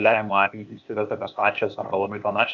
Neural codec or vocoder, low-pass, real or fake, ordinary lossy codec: codec, 16 kHz, 0.8 kbps, ZipCodec; 7.2 kHz; fake; Opus, 64 kbps